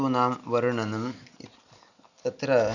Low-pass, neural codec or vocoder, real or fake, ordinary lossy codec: 7.2 kHz; none; real; none